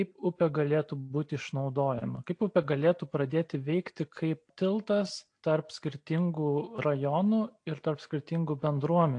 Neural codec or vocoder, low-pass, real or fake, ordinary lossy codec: none; 9.9 kHz; real; AAC, 48 kbps